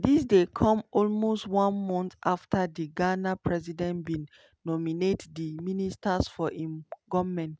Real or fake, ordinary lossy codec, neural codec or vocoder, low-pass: real; none; none; none